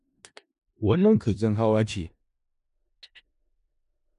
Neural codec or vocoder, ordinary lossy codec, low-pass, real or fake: codec, 16 kHz in and 24 kHz out, 0.4 kbps, LongCat-Audio-Codec, four codebook decoder; AAC, 96 kbps; 10.8 kHz; fake